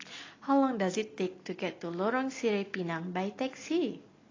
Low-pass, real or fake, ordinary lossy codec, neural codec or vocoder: 7.2 kHz; real; AAC, 32 kbps; none